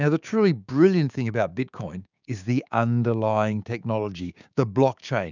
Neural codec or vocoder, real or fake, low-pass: codec, 16 kHz, 6 kbps, DAC; fake; 7.2 kHz